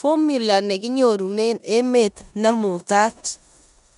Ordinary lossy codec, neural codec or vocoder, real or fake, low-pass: none; codec, 16 kHz in and 24 kHz out, 0.9 kbps, LongCat-Audio-Codec, four codebook decoder; fake; 10.8 kHz